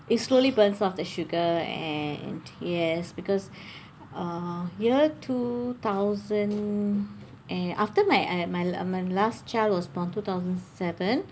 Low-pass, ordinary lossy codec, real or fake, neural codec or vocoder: none; none; real; none